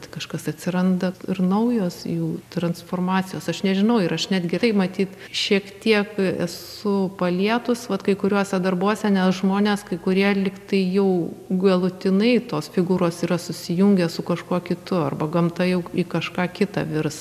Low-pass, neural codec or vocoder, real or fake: 14.4 kHz; none; real